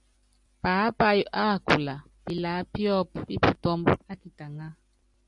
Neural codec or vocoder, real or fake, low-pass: none; real; 10.8 kHz